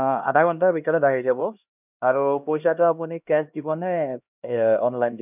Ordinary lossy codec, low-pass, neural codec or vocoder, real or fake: none; 3.6 kHz; codec, 16 kHz, 1 kbps, X-Codec, HuBERT features, trained on LibriSpeech; fake